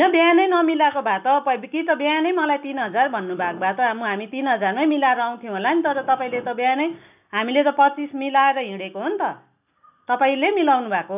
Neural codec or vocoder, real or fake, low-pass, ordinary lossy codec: none; real; 3.6 kHz; none